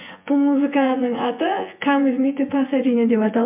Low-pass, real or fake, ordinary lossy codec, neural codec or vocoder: 3.6 kHz; fake; MP3, 32 kbps; vocoder, 24 kHz, 100 mel bands, Vocos